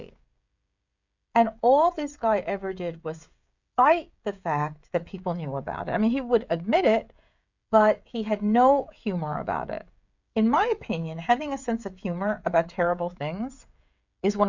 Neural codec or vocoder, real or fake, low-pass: codec, 16 kHz, 16 kbps, FreqCodec, smaller model; fake; 7.2 kHz